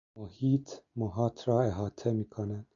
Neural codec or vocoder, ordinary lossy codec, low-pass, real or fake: none; AAC, 64 kbps; 7.2 kHz; real